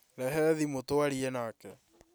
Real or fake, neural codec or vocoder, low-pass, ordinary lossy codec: real; none; none; none